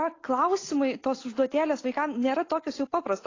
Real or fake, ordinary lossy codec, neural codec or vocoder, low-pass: real; AAC, 32 kbps; none; 7.2 kHz